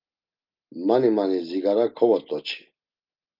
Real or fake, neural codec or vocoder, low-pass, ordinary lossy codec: real; none; 5.4 kHz; Opus, 24 kbps